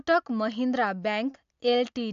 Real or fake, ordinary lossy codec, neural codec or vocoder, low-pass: real; AAC, 64 kbps; none; 7.2 kHz